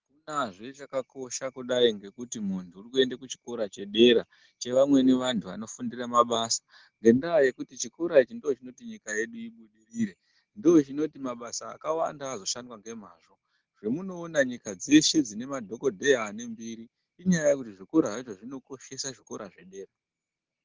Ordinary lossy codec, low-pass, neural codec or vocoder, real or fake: Opus, 16 kbps; 7.2 kHz; none; real